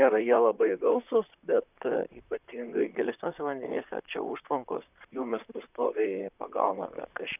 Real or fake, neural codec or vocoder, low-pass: fake; vocoder, 44.1 kHz, 128 mel bands, Pupu-Vocoder; 3.6 kHz